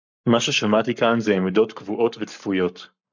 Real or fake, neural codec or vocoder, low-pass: fake; codec, 44.1 kHz, 7.8 kbps, Pupu-Codec; 7.2 kHz